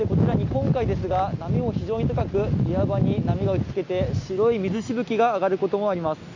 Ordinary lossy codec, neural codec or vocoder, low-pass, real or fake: AAC, 48 kbps; none; 7.2 kHz; real